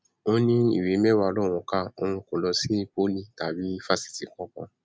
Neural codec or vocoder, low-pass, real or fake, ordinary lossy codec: none; none; real; none